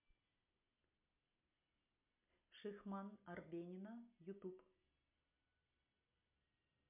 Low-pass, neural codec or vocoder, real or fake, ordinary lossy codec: 3.6 kHz; none; real; MP3, 32 kbps